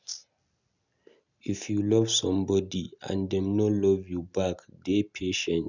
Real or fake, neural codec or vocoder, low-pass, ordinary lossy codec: real; none; 7.2 kHz; none